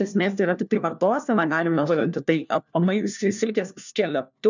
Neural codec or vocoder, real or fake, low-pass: codec, 16 kHz, 1 kbps, FunCodec, trained on LibriTTS, 50 frames a second; fake; 7.2 kHz